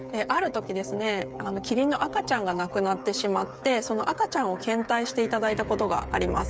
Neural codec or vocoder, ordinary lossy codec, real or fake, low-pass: codec, 16 kHz, 8 kbps, FreqCodec, smaller model; none; fake; none